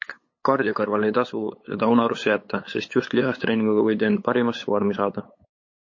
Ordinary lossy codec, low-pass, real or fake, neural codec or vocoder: MP3, 32 kbps; 7.2 kHz; fake; codec, 16 kHz, 8 kbps, FunCodec, trained on LibriTTS, 25 frames a second